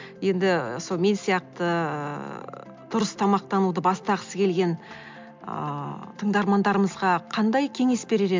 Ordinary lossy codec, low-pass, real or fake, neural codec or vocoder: MP3, 64 kbps; 7.2 kHz; real; none